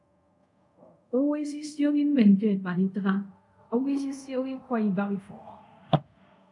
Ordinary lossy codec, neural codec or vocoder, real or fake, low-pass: MP3, 64 kbps; codec, 24 kHz, 0.5 kbps, DualCodec; fake; 10.8 kHz